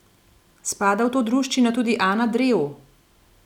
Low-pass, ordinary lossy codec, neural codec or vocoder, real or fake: 19.8 kHz; none; none; real